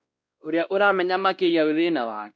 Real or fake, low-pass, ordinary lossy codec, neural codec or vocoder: fake; none; none; codec, 16 kHz, 1 kbps, X-Codec, WavLM features, trained on Multilingual LibriSpeech